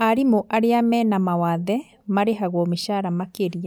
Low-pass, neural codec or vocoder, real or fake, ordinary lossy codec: none; none; real; none